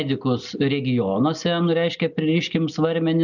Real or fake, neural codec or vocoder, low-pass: real; none; 7.2 kHz